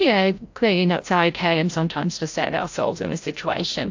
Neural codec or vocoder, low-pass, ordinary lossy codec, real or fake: codec, 16 kHz, 0.5 kbps, FreqCodec, larger model; 7.2 kHz; AAC, 48 kbps; fake